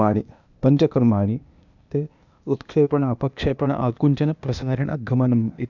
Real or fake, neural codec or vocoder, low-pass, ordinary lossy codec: fake; codec, 16 kHz, 0.8 kbps, ZipCodec; 7.2 kHz; none